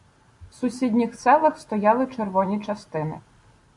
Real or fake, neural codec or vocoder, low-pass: real; none; 10.8 kHz